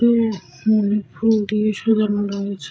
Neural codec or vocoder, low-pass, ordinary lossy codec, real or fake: codec, 16 kHz, 16 kbps, FreqCodec, larger model; none; none; fake